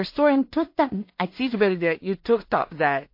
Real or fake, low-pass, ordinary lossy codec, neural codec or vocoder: fake; 5.4 kHz; MP3, 32 kbps; codec, 16 kHz in and 24 kHz out, 0.4 kbps, LongCat-Audio-Codec, two codebook decoder